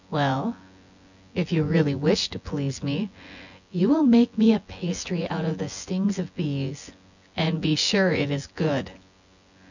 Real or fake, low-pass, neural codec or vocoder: fake; 7.2 kHz; vocoder, 24 kHz, 100 mel bands, Vocos